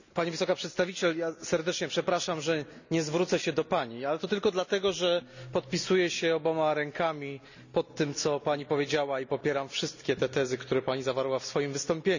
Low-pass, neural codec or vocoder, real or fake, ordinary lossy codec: 7.2 kHz; none; real; none